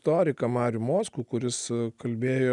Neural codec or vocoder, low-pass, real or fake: none; 10.8 kHz; real